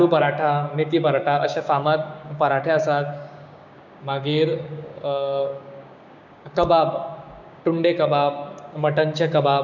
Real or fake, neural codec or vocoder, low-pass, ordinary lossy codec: fake; codec, 16 kHz, 6 kbps, DAC; 7.2 kHz; none